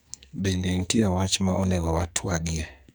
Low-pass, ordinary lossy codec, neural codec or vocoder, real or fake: none; none; codec, 44.1 kHz, 2.6 kbps, SNAC; fake